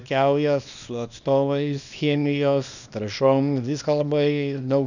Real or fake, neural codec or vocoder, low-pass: fake; codec, 24 kHz, 0.9 kbps, WavTokenizer, small release; 7.2 kHz